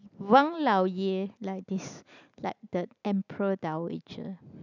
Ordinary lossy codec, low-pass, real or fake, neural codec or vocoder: none; 7.2 kHz; real; none